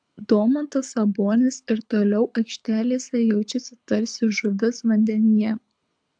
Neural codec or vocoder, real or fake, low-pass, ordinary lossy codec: codec, 24 kHz, 6 kbps, HILCodec; fake; 9.9 kHz; AAC, 64 kbps